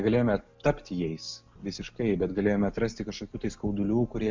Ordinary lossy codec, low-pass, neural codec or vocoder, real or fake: MP3, 64 kbps; 7.2 kHz; none; real